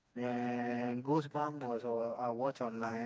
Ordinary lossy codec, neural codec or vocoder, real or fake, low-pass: none; codec, 16 kHz, 2 kbps, FreqCodec, smaller model; fake; none